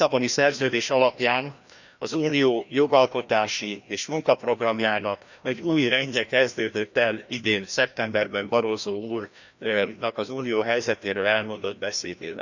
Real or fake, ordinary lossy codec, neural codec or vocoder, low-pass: fake; none; codec, 16 kHz, 1 kbps, FreqCodec, larger model; 7.2 kHz